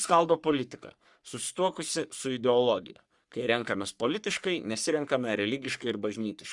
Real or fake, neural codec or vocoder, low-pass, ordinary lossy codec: fake; codec, 44.1 kHz, 3.4 kbps, Pupu-Codec; 10.8 kHz; Opus, 64 kbps